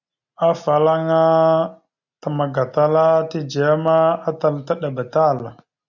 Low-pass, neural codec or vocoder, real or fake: 7.2 kHz; none; real